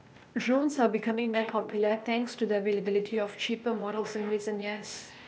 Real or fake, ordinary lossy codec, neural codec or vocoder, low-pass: fake; none; codec, 16 kHz, 0.8 kbps, ZipCodec; none